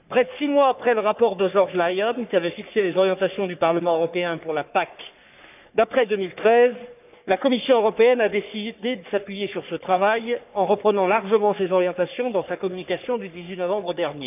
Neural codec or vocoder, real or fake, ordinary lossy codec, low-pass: codec, 44.1 kHz, 3.4 kbps, Pupu-Codec; fake; none; 3.6 kHz